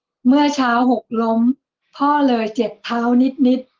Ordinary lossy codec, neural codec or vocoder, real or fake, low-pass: Opus, 16 kbps; none; real; 7.2 kHz